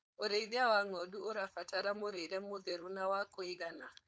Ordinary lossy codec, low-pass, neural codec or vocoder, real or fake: none; none; codec, 16 kHz, 4.8 kbps, FACodec; fake